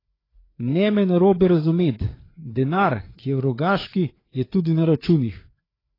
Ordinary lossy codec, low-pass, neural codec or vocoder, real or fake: AAC, 24 kbps; 5.4 kHz; codec, 16 kHz, 4 kbps, FreqCodec, larger model; fake